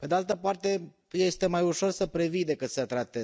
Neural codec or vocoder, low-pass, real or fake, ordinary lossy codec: none; none; real; none